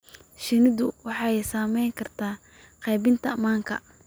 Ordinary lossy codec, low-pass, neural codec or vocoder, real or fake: none; none; none; real